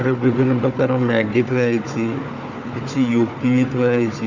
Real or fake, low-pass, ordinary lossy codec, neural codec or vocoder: fake; 7.2 kHz; Opus, 64 kbps; codec, 16 kHz, 4 kbps, FunCodec, trained on Chinese and English, 50 frames a second